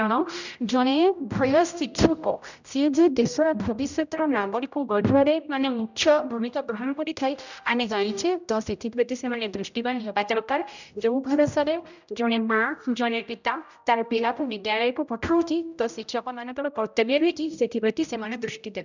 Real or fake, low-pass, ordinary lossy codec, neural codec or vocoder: fake; 7.2 kHz; none; codec, 16 kHz, 0.5 kbps, X-Codec, HuBERT features, trained on general audio